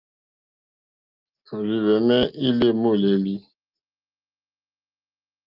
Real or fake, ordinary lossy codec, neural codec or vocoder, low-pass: real; Opus, 32 kbps; none; 5.4 kHz